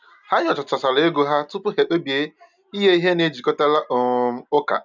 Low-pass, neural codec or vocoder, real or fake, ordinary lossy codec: 7.2 kHz; none; real; none